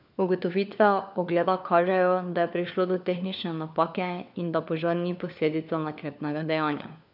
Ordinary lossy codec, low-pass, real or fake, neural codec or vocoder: none; 5.4 kHz; fake; codec, 16 kHz, 4 kbps, FunCodec, trained on LibriTTS, 50 frames a second